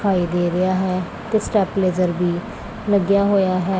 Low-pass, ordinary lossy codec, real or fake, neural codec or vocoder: none; none; real; none